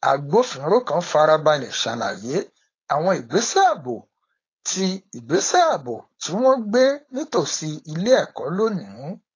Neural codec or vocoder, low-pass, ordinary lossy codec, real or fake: codec, 16 kHz, 4.8 kbps, FACodec; 7.2 kHz; AAC, 32 kbps; fake